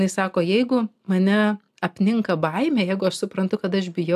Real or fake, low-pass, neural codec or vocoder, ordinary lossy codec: real; 14.4 kHz; none; AAC, 96 kbps